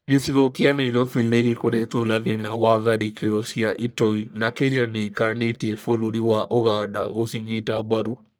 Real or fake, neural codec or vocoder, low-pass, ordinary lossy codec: fake; codec, 44.1 kHz, 1.7 kbps, Pupu-Codec; none; none